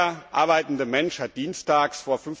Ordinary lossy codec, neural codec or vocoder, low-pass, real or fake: none; none; none; real